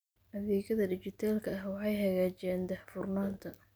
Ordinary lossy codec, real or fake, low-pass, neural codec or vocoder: none; real; none; none